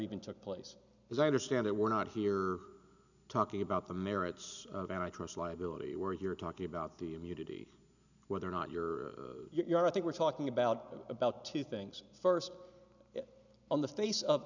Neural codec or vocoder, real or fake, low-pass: none; real; 7.2 kHz